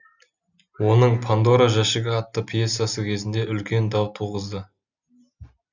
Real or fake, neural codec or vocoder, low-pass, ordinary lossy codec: real; none; 7.2 kHz; none